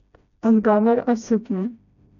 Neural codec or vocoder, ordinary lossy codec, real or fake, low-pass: codec, 16 kHz, 1 kbps, FreqCodec, smaller model; none; fake; 7.2 kHz